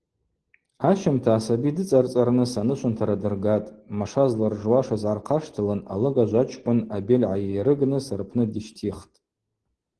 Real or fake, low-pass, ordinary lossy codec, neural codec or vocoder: real; 10.8 kHz; Opus, 16 kbps; none